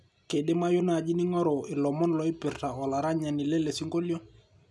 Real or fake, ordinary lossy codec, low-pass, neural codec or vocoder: real; none; none; none